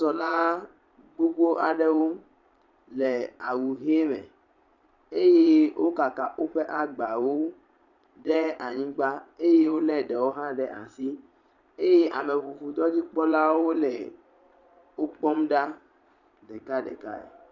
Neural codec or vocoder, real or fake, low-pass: vocoder, 22.05 kHz, 80 mel bands, Vocos; fake; 7.2 kHz